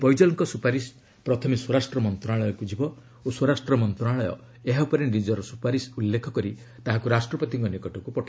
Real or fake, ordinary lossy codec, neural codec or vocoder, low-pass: real; none; none; none